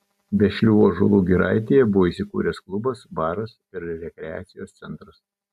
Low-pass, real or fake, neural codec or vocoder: 14.4 kHz; real; none